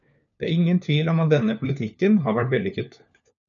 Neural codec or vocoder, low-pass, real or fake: codec, 16 kHz, 4 kbps, FunCodec, trained on LibriTTS, 50 frames a second; 7.2 kHz; fake